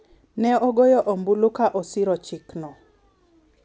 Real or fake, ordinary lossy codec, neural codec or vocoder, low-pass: real; none; none; none